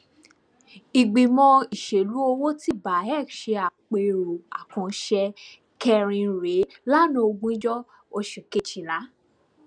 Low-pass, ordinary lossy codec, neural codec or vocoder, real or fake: 9.9 kHz; none; none; real